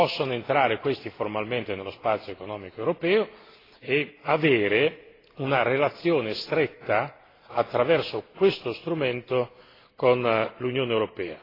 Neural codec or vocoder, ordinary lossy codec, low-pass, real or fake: none; AAC, 24 kbps; 5.4 kHz; real